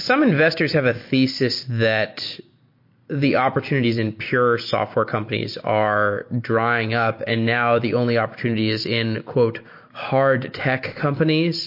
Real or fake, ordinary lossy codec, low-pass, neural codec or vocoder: real; MP3, 32 kbps; 5.4 kHz; none